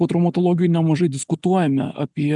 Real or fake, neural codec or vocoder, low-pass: real; none; 10.8 kHz